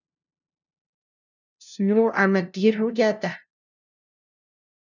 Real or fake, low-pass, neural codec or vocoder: fake; 7.2 kHz; codec, 16 kHz, 0.5 kbps, FunCodec, trained on LibriTTS, 25 frames a second